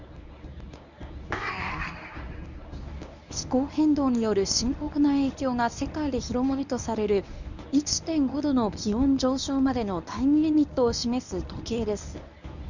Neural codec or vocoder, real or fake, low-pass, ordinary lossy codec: codec, 24 kHz, 0.9 kbps, WavTokenizer, medium speech release version 1; fake; 7.2 kHz; none